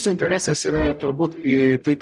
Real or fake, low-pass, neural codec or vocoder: fake; 10.8 kHz; codec, 44.1 kHz, 0.9 kbps, DAC